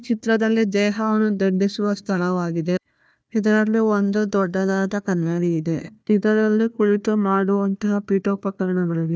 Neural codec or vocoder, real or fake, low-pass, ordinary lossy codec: codec, 16 kHz, 1 kbps, FunCodec, trained on Chinese and English, 50 frames a second; fake; none; none